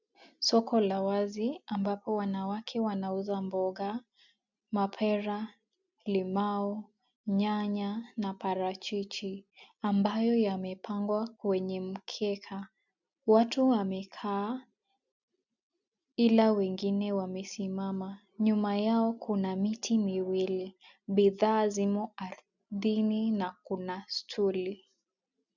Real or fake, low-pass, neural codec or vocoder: real; 7.2 kHz; none